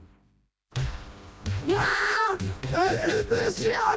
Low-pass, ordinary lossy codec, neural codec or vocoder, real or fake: none; none; codec, 16 kHz, 1 kbps, FreqCodec, smaller model; fake